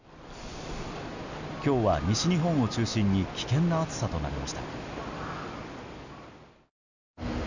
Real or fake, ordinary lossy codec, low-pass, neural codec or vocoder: real; none; 7.2 kHz; none